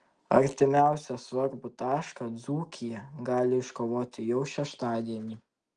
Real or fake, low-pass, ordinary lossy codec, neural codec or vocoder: real; 9.9 kHz; Opus, 24 kbps; none